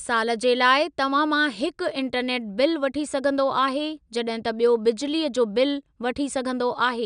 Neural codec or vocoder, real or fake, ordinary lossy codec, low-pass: none; real; none; 9.9 kHz